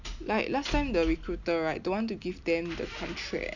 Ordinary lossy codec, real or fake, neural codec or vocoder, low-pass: none; real; none; 7.2 kHz